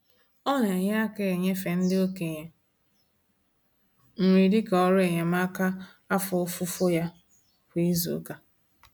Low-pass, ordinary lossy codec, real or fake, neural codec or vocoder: none; none; real; none